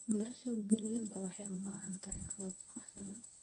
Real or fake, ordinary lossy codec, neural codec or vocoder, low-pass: fake; none; codec, 24 kHz, 0.9 kbps, WavTokenizer, medium speech release version 1; 10.8 kHz